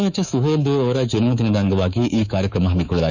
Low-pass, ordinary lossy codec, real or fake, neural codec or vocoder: 7.2 kHz; none; fake; codec, 24 kHz, 3.1 kbps, DualCodec